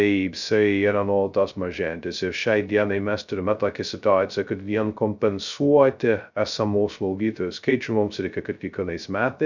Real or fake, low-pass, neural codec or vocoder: fake; 7.2 kHz; codec, 16 kHz, 0.2 kbps, FocalCodec